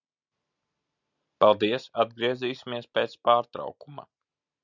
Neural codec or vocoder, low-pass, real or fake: none; 7.2 kHz; real